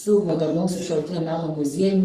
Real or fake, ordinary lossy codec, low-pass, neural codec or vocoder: fake; Opus, 64 kbps; 14.4 kHz; codec, 44.1 kHz, 3.4 kbps, Pupu-Codec